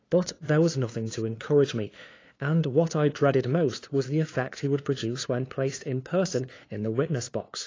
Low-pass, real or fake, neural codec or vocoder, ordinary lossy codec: 7.2 kHz; fake; codec, 16 kHz, 2 kbps, FunCodec, trained on LibriTTS, 25 frames a second; AAC, 32 kbps